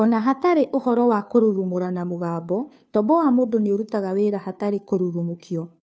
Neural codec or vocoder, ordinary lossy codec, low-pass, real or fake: codec, 16 kHz, 2 kbps, FunCodec, trained on Chinese and English, 25 frames a second; none; none; fake